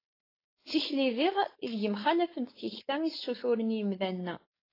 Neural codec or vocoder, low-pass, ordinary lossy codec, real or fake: codec, 16 kHz, 4.8 kbps, FACodec; 5.4 kHz; AAC, 24 kbps; fake